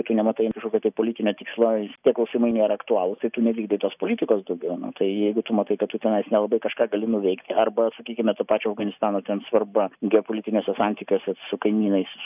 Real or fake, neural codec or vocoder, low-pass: real; none; 3.6 kHz